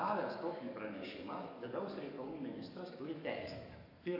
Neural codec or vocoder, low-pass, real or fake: codec, 44.1 kHz, 7.8 kbps, DAC; 5.4 kHz; fake